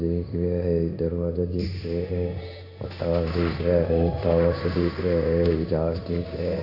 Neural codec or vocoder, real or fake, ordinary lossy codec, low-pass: codec, 16 kHz in and 24 kHz out, 1 kbps, XY-Tokenizer; fake; none; 5.4 kHz